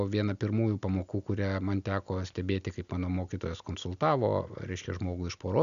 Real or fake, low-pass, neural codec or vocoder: real; 7.2 kHz; none